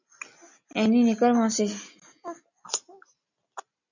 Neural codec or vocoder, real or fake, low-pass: none; real; 7.2 kHz